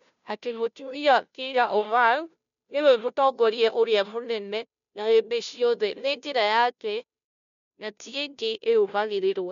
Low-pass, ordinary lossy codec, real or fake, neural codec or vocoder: 7.2 kHz; none; fake; codec, 16 kHz, 0.5 kbps, FunCodec, trained on Chinese and English, 25 frames a second